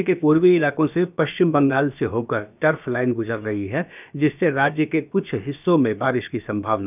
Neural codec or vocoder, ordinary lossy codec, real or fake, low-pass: codec, 16 kHz, about 1 kbps, DyCAST, with the encoder's durations; none; fake; 3.6 kHz